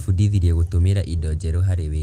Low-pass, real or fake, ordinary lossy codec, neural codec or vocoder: 14.4 kHz; real; Opus, 24 kbps; none